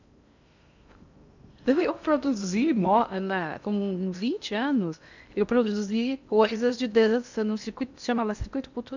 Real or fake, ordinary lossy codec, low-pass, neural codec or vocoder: fake; none; 7.2 kHz; codec, 16 kHz in and 24 kHz out, 0.6 kbps, FocalCodec, streaming, 2048 codes